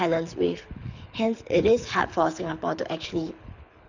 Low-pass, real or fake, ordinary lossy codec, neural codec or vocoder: 7.2 kHz; fake; none; codec, 24 kHz, 6 kbps, HILCodec